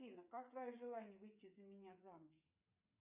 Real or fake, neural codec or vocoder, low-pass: fake; codec, 16 kHz, 8 kbps, FreqCodec, smaller model; 3.6 kHz